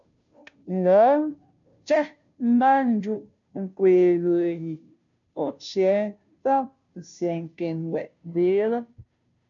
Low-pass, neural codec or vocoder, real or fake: 7.2 kHz; codec, 16 kHz, 0.5 kbps, FunCodec, trained on Chinese and English, 25 frames a second; fake